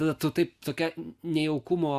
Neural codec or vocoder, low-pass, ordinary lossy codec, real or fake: none; 14.4 kHz; Opus, 64 kbps; real